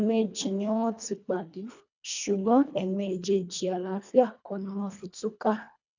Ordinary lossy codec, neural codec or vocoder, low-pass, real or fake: none; codec, 24 kHz, 1.5 kbps, HILCodec; 7.2 kHz; fake